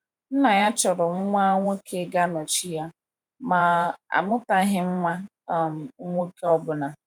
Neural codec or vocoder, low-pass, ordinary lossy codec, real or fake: vocoder, 44.1 kHz, 128 mel bands every 512 samples, BigVGAN v2; 19.8 kHz; none; fake